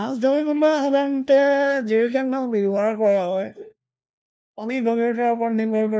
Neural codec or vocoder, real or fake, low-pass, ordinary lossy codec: codec, 16 kHz, 1 kbps, FunCodec, trained on LibriTTS, 50 frames a second; fake; none; none